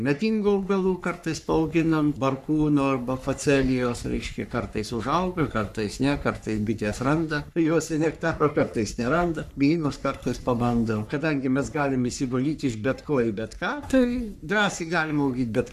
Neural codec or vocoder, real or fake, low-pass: codec, 44.1 kHz, 3.4 kbps, Pupu-Codec; fake; 14.4 kHz